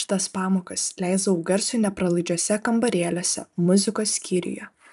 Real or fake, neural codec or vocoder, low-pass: fake; vocoder, 44.1 kHz, 128 mel bands every 256 samples, BigVGAN v2; 14.4 kHz